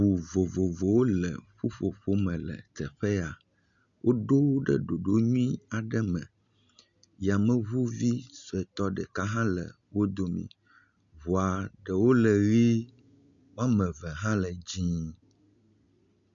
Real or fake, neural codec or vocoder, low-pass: real; none; 7.2 kHz